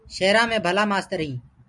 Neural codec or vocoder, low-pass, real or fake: none; 10.8 kHz; real